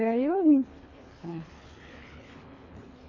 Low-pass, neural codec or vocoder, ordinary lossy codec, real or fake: 7.2 kHz; codec, 24 kHz, 3 kbps, HILCodec; AAC, 32 kbps; fake